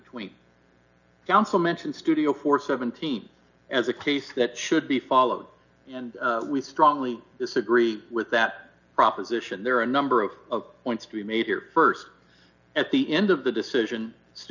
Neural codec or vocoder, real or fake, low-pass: none; real; 7.2 kHz